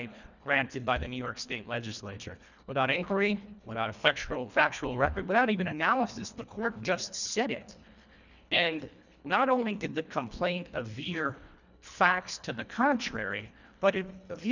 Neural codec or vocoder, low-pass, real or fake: codec, 24 kHz, 1.5 kbps, HILCodec; 7.2 kHz; fake